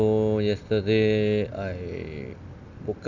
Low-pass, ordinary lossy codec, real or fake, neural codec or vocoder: 7.2 kHz; Opus, 64 kbps; real; none